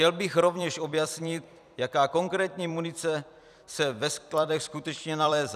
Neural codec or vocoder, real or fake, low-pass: vocoder, 44.1 kHz, 128 mel bands every 256 samples, BigVGAN v2; fake; 14.4 kHz